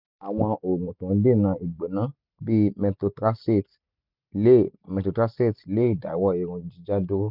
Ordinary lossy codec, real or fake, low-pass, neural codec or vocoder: none; real; 5.4 kHz; none